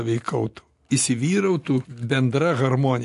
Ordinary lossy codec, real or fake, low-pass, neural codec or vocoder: AAC, 64 kbps; real; 10.8 kHz; none